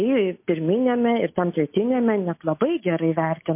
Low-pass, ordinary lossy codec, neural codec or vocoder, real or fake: 3.6 kHz; MP3, 24 kbps; none; real